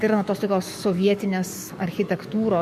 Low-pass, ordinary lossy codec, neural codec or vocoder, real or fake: 14.4 kHz; MP3, 64 kbps; codec, 44.1 kHz, 7.8 kbps, DAC; fake